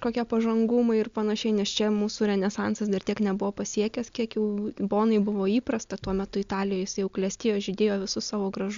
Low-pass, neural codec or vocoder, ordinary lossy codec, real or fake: 7.2 kHz; none; Opus, 64 kbps; real